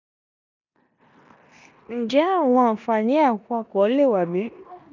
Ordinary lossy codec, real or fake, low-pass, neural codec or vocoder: none; fake; 7.2 kHz; codec, 16 kHz in and 24 kHz out, 0.9 kbps, LongCat-Audio-Codec, four codebook decoder